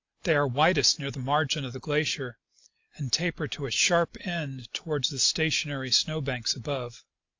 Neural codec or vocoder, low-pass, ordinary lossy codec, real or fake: none; 7.2 kHz; AAC, 48 kbps; real